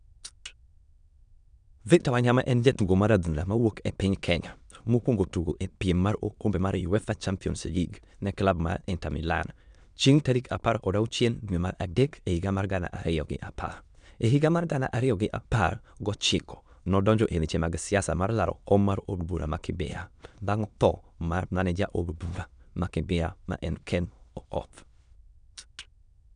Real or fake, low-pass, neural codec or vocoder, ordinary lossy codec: fake; 9.9 kHz; autoencoder, 22.05 kHz, a latent of 192 numbers a frame, VITS, trained on many speakers; none